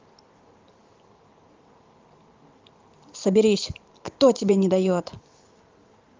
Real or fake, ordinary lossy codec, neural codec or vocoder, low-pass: fake; Opus, 24 kbps; vocoder, 44.1 kHz, 128 mel bands every 512 samples, BigVGAN v2; 7.2 kHz